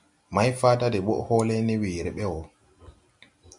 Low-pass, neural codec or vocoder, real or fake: 10.8 kHz; none; real